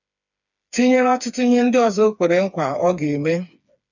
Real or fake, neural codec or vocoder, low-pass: fake; codec, 16 kHz, 4 kbps, FreqCodec, smaller model; 7.2 kHz